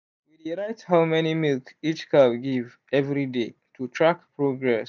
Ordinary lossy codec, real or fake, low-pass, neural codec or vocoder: none; real; 7.2 kHz; none